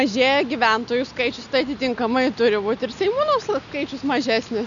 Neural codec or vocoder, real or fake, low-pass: none; real; 7.2 kHz